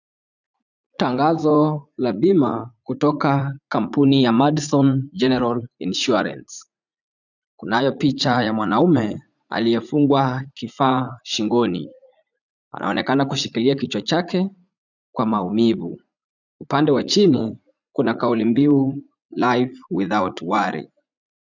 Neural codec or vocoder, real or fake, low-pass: vocoder, 44.1 kHz, 80 mel bands, Vocos; fake; 7.2 kHz